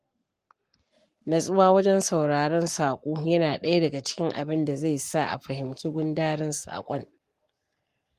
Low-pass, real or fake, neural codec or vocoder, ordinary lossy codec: 14.4 kHz; fake; codec, 44.1 kHz, 7.8 kbps, Pupu-Codec; Opus, 24 kbps